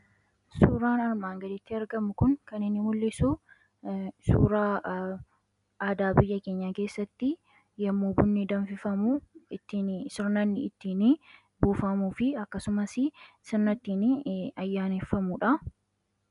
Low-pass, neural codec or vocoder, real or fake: 10.8 kHz; none; real